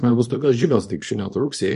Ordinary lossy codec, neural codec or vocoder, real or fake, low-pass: MP3, 48 kbps; codec, 24 kHz, 0.9 kbps, WavTokenizer, small release; fake; 10.8 kHz